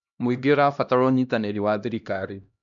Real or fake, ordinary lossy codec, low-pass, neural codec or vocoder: fake; none; 7.2 kHz; codec, 16 kHz, 1 kbps, X-Codec, HuBERT features, trained on LibriSpeech